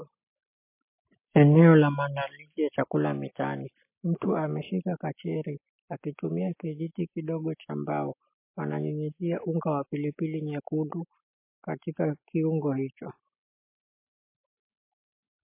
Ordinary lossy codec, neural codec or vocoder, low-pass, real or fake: MP3, 24 kbps; none; 3.6 kHz; real